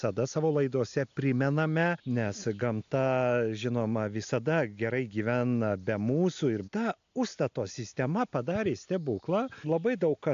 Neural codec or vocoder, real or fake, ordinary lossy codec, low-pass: none; real; AAC, 64 kbps; 7.2 kHz